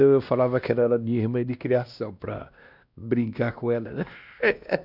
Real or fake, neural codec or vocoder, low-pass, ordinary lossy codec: fake; codec, 16 kHz, 1 kbps, X-Codec, WavLM features, trained on Multilingual LibriSpeech; 5.4 kHz; none